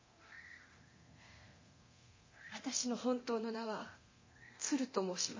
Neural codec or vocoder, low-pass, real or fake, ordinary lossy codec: codec, 24 kHz, 0.9 kbps, DualCodec; 7.2 kHz; fake; MP3, 32 kbps